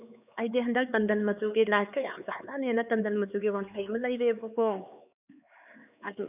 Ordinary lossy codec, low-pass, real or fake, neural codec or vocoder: none; 3.6 kHz; fake; codec, 16 kHz, 4 kbps, X-Codec, HuBERT features, trained on LibriSpeech